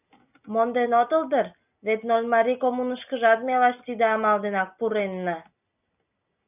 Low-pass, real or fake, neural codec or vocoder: 3.6 kHz; real; none